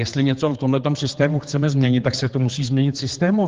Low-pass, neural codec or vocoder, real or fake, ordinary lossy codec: 7.2 kHz; codec, 16 kHz, 4 kbps, X-Codec, HuBERT features, trained on general audio; fake; Opus, 16 kbps